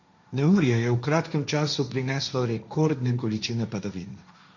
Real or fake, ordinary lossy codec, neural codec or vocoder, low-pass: fake; none; codec, 16 kHz, 1.1 kbps, Voila-Tokenizer; 7.2 kHz